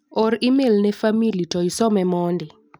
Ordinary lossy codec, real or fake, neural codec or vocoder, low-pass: none; real; none; none